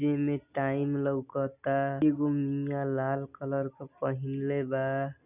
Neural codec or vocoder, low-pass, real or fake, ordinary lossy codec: none; 3.6 kHz; real; none